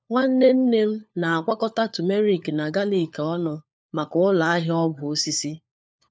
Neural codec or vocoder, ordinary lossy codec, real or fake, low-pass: codec, 16 kHz, 4 kbps, FunCodec, trained on LibriTTS, 50 frames a second; none; fake; none